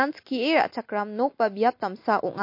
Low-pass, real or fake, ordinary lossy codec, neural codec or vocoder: 5.4 kHz; real; MP3, 32 kbps; none